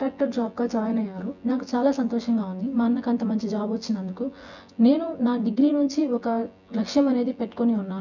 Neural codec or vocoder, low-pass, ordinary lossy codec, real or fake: vocoder, 24 kHz, 100 mel bands, Vocos; 7.2 kHz; none; fake